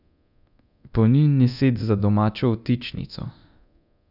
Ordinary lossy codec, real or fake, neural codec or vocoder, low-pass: none; fake; codec, 24 kHz, 0.9 kbps, DualCodec; 5.4 kHz